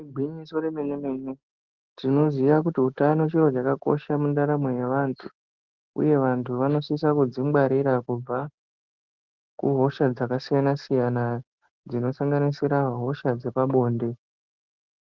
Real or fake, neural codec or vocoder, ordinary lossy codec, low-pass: real; none; Opus, 16 kbps; 7.2 kHz